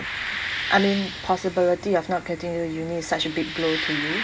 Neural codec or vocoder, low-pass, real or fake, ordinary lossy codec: none; none; real; none